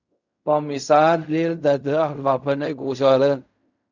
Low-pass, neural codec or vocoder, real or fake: 7.2 kHz; codec, 16 kHz in and 24 kHz out, 0.4 kbps, LongCat-Audio-Codec, fine tuned four codebook decoder; fake